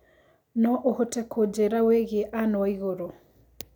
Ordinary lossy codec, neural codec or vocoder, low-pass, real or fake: none; none; 19.8 kHz; real